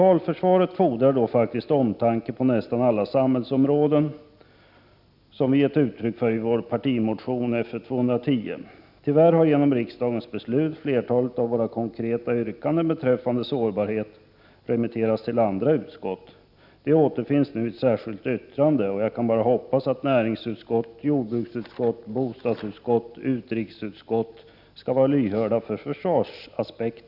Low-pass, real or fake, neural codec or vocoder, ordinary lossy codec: 5.4 kHz; real; none; none